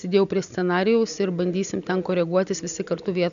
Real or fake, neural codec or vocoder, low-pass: real; none; 7.2 kHz